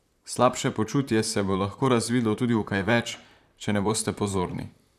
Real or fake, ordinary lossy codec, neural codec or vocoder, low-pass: fake; none; vocoder, 44.1 kHz, 128 mel bands, Pupu-Vocoder; 14.4 kHz